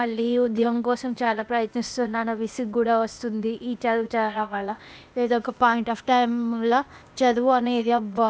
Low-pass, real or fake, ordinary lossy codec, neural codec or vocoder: none; fake; none; codec, 16 kHz, 0.8 kbps, ZipCodec